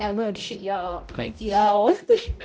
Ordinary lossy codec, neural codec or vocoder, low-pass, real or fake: none; codec, 16 kHz, 0.5 kbps, X-Codec, HuBERT features, trained on balanced general audio; none; fake